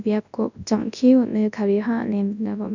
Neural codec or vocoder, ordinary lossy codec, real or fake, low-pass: codec, 24 kHz, 0.9 kbps, WavTokenizer, large speech release; none; fake; 7.2 kHz